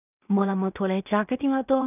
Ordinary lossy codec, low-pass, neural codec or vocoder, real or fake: none; 3.6 kHz; codec, 16 kHz in and 24 kHz out, 0.4 kbps, LongCat-Audio-Codec, two codebook decoder; fake